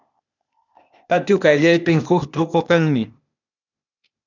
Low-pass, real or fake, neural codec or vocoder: 7.2 kHz; fake; codec, 16 kHz, 0.8 kbps, ZipCodec